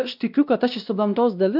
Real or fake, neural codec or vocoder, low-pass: fake; codec, 16 kHz, 1 kbps, X-Codec, WavLM features, trained on Multilingual LibriSpeech; 5.4 kHz